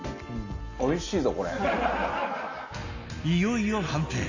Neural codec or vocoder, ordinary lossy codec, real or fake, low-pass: none; AAC, 48 kbps; real; 7.2 kHz